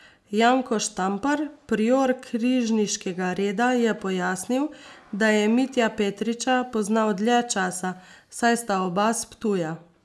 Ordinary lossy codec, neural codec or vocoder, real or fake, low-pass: none; none; real; none